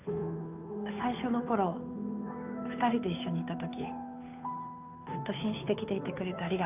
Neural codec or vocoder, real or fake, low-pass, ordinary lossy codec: codec, 16 kHz, 2 kbps, FunCodec, trained on Chinese and English, 25 frames a second; fake; 3.6 kHz; none